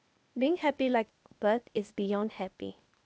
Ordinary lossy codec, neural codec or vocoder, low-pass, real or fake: none; codec, 16 kHz, 0.8 kbps, ZipCodec; none; fake